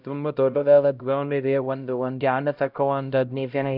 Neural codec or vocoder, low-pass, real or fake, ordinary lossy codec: codec, 16 kHz, 0.5 kbps, X-Codec, HuBERT features, trained on LibriSpeech; 5.4 kHz; fake; none